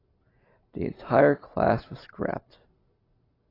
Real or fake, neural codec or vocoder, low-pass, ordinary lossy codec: real; none; 5.4 kHz; AAC, 24 kbps